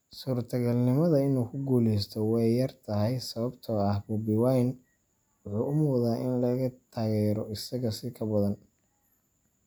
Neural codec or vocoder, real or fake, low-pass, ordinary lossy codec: none; real; none; none